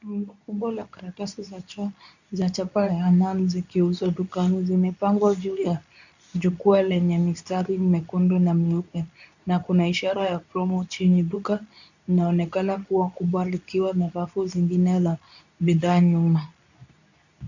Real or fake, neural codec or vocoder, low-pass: fake; codec, 24 kHz, 0.9 kbps, WavTokenizer, medium speech release version 2; 7.2 kHz